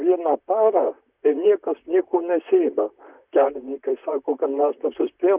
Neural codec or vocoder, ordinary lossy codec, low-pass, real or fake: vocoder, 44.1 kHz, 128 mel bands, Pupu-Vocoder; Opus, 64 kbps; 3.6 kHz; fake